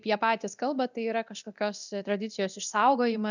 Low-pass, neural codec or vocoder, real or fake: 7.2 kHz; codec, 24 kHz, 0.9 kbps, DualCodec; fake